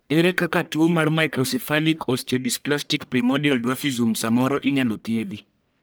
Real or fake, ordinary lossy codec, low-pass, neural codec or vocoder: fake; none; none; codec, 44.1 kHz, 1.7 kbps, Pupu-Codec